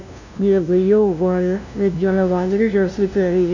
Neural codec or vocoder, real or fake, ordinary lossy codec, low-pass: codec, 16 kHz, 0.5 kbps, FunCodec, trained on LibriTTS, 25 frames a second; fake; none; 7.2 kHz